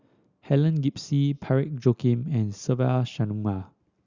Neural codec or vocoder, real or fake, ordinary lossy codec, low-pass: none; real; Opus, 64 kbps; 7.2 kHz